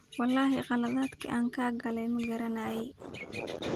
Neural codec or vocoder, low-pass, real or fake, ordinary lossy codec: none; 14.4 kHz; real; Opus, 24 kbps